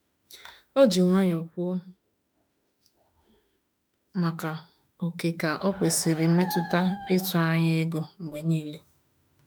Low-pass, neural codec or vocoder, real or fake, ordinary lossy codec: none; autoencoder, 48 kHz, 32 numbers a frame, DAC-VAE, trained on Japanese speech; fake; none